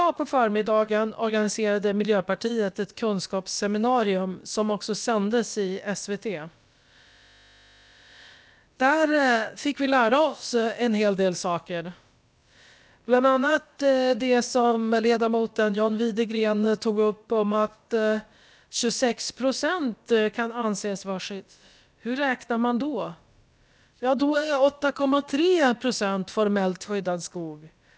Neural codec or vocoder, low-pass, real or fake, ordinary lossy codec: codec, 16 kHz, about 1 kbps, DyCAST, with the encoder's durations; none; fake; none